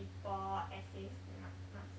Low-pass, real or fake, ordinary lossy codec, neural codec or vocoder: none; real; none; none